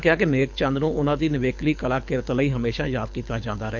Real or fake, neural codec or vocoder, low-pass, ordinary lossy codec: fake; codec, 24 kHz, 6 kbps, HILCodec; 7.2 kHz; none